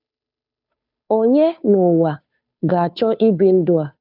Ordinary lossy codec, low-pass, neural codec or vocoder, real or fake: none; 5.4 kHz; codec, 16 kHz, 2 kbps, FunCodec, trained on Chinese and English, 25 frames a second; fake